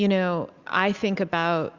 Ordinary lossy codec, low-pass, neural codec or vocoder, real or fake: Opus, 64 kbps; 7.2 kHz; none; real